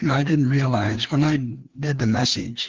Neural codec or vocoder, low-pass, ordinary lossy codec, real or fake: codec, 16 kHz, 2 kbps, FreqCodec, larger model; 7.2 kHz; Opus, 16 kbps; fake